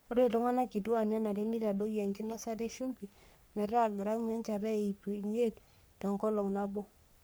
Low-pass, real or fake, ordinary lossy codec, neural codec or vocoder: none; fake; none; codec, 44.1 kHz, 3.4 kbps, Pupu-Codec